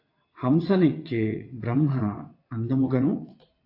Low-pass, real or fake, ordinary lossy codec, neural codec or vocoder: 5.4 kHz; fake; AAC, 32 kbps; vocoder, 22.05 kHz, 80 mel bands, WaveNeXt